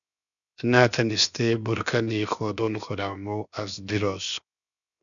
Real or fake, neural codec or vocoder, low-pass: fake; codec, 16 kHz, 0.7 kbps, FocalCodec; 7.2 kHz